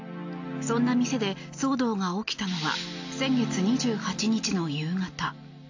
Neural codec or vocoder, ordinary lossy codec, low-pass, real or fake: none; AAC, 48 kbps; 7.2 kHz; real